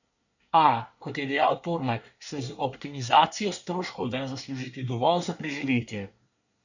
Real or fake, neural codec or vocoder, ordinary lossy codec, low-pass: fake; codec, 24 kHz, 1 kbps, SNAC; none; 7.2 kHz